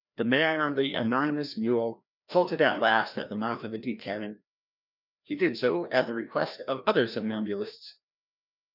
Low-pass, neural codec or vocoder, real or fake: 5.4 kHz; codec, 16 kHz, 1 kbps, FreqCodec, larger model; fake